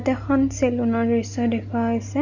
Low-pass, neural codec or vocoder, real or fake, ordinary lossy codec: 7.2 kHz; none; real; none